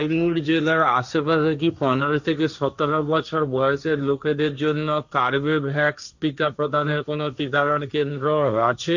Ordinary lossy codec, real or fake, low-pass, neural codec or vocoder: none; fake; 7.2 kHz; codec, 16 kHz, 1.1 kbps, Voila-Tokenizer